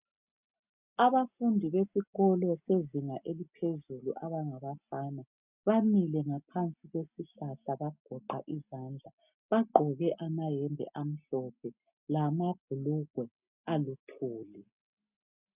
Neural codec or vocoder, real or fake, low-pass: none; real; 3.6 kHz